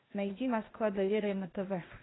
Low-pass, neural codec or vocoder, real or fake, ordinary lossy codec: 7.2 kHz; codec, 16 kHz, 0.8 kbps, ZipCodec; fake; AAC, 16 kbps